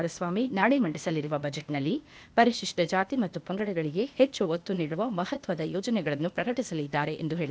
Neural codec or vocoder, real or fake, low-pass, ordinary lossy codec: codec, 16 kHz, 0.8 kbps, ZipCodec; fake; none; none